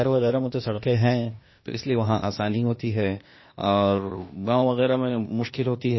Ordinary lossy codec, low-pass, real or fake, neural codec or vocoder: MP3, 24 kbps; 7.2 kHz; fake; codec, 16 kHz, 0.8 kbps, ZipCodec